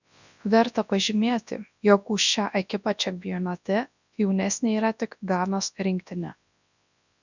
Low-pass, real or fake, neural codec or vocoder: 7.2 kHz; fake; codec, 24 kHz, 0.9 kbps, WavTokenizer, large speech release